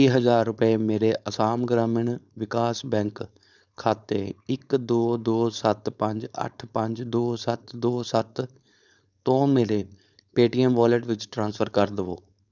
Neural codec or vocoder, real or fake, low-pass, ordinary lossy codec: codec, 16 kHz, 4.8 kbps, FACodec; fake; 7.2 kHz; none